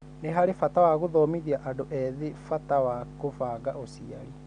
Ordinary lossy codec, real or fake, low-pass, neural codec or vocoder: none; real; 9.9 kHz; none